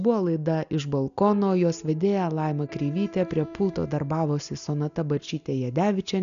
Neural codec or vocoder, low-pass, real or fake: none; 7.2 kHz; real